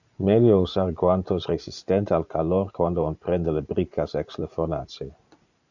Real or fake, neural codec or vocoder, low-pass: fake; vocoder, 44.1 kHz, 80 mel bands, Vocos; 7.2 kHz